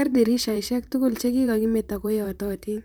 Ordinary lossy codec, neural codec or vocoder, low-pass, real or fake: none; vocoder, 44.1 kHz, 128 mel bands, Pupu-Vocoder; none; fake